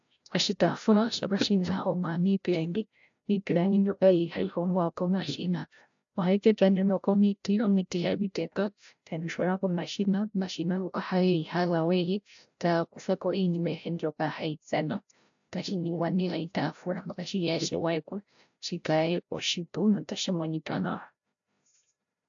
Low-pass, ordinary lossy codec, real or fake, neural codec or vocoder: 7.2 kHz; AAC, 64 kbps; fake; codec, 16 kHz, 0.5 kbps, FreqCodec, larger model